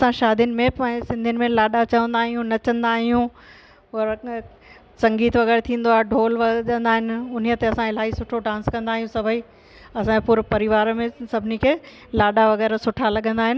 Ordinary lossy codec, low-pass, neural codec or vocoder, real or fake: none; none; none; real